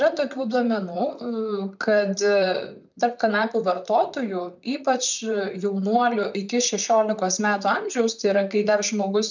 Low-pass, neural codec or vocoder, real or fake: 7.2 kHz; vocoder, 44.1 kHz, 128 mel bands, Pupu-Vocoder; fake